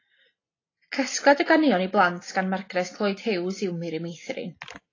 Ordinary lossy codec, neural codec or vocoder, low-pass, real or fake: AAC, 32 kbps; none; 7.2 kHz; real